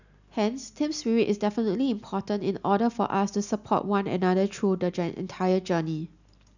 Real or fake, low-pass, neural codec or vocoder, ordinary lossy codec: real; 7.2 kHz; none; none